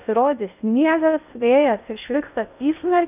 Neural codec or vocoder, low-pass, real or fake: codec, 16 kHz in and 24 kHz out, 0.6 kbps, FocalCodec, streaming, 2048 codes; 3.6 kHz; fake